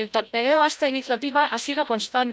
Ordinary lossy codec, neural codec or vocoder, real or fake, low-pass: none; codec, 16 kHz, 0.5 kbps, FreqCodec, larger model; fake; none